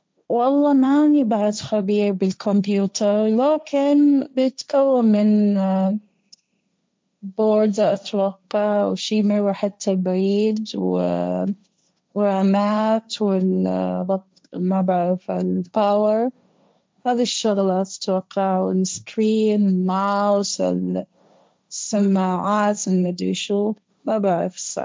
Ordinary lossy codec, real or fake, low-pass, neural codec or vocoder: none; fake; none; codec, 16 kHz, 1.1 kbps, Voila-Tokenizer